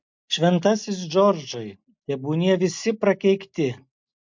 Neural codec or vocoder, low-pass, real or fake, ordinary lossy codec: none; 7.2 kHz; real; MP3, 64 kbps